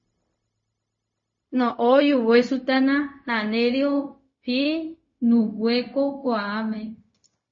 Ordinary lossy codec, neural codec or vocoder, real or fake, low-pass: MP3, 32 kbps; codec, 16 kHz, 0.4 kbps, LongCat-Audio-Codec; fake; 7.2 kHz